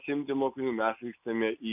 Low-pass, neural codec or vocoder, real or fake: 3.6 kHz; none; real